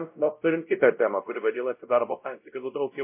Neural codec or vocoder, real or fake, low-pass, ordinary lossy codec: codec, 16 kHz, 0.5 kbps, X-Codec, WavLM features, trained on Multilingual LibriSpeech; fake; 3.6 kHz; MP3, 24 kbps